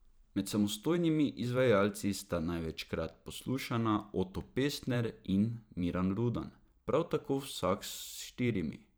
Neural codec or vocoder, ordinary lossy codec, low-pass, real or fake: vocoder, 44.1 kHz, 128 mel bands every 512 samples, BigVGAN v2; none; none; fake